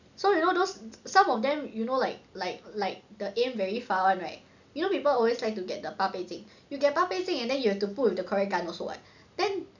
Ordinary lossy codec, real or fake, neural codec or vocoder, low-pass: none; real; none; 7.2 kHz